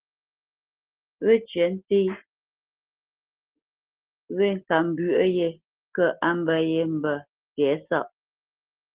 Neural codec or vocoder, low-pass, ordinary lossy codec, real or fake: none; 3.6 kHz; Opus, 16 kbps; real